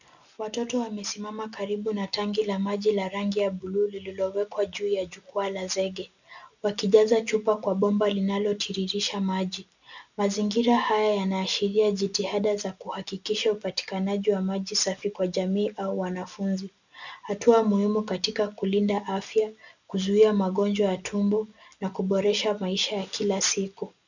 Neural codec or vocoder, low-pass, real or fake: none; 7.2 kHz; real